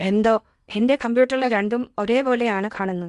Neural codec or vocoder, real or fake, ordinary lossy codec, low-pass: codec, 16 kHz in and 24 kHz out, 0.8 kbps, FocalCodec, streaming, 65536 codes; fake; Opus, 64 kbps; 10.8 kHz